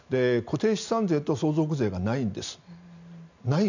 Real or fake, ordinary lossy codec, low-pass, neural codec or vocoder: real; none; 7.2 kHz; none